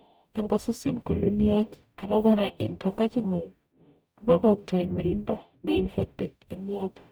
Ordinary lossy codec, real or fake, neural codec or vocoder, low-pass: none; fake; codec, 44.1 kHz, 0.9 kbps, DAC; none